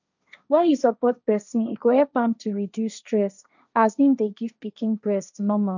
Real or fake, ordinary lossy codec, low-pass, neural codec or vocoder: fake; none; 7.2 kHz; codec, 16 kHz, 1.1 kbps, Voila-Tokenizer